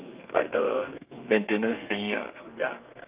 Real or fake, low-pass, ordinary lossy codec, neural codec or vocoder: fake; 3.6 kHz; Opus, 24 kbps; codec, 32 kHz, 1.9 kbps, SNAC